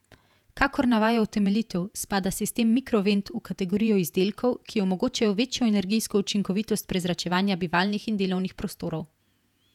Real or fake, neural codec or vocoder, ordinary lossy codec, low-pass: fake; vocoder, 48 kHz, 128 mel bands, Vocos; none; 19.8 kHz